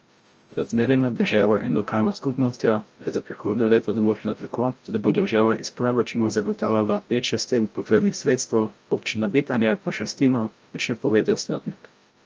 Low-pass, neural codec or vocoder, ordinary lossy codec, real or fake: 7.2 kHz; codec, 16 kHz, 0.5 kbps, FreqCodec, larger model; Opus, 24 kbps; fake